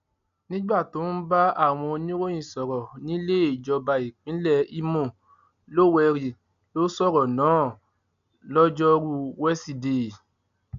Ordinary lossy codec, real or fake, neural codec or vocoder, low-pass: none; real; none; 7.2 kHz